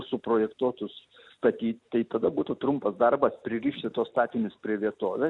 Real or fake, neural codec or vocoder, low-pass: real; none; 10.8 kHz